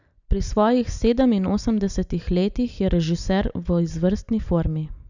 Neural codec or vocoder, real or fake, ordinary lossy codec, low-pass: none; real; none; 7.2 kHz